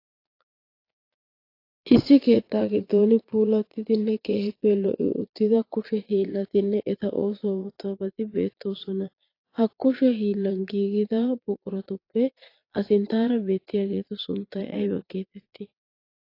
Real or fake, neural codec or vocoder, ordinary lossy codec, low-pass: fake; vocoder, 22.05 kHz, 80 mel bands, Vocos; AAC, 32 kbps; 5.4 kHz